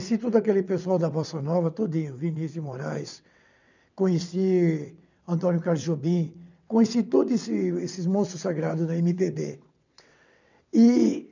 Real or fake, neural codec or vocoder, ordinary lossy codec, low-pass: fake; vocoder, 22.05 kHz, 80 mel bands, WaveNeXt; none; 7.2 kHz